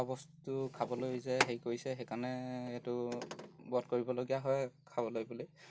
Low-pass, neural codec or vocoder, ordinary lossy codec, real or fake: none; none; none; real